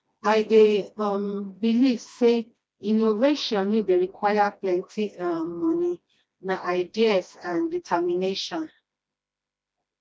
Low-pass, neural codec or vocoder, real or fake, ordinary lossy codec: none; codec, 16 kHz, 1 kbps, FreqCodec, smaller model; fake; none